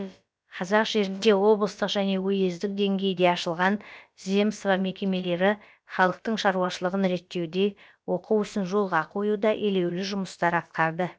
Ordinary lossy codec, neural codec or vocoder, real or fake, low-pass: none; codec, 16 kHz, about 1 kbps, DyCAST, with the encoder's durations; fake; none